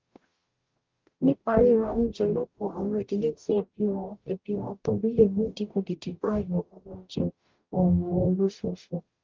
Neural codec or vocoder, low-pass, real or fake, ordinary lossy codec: codec, 44.1 kHz, 0.9 kbps, DAC; 7.2 kHz; fake; Opus, 32 kbps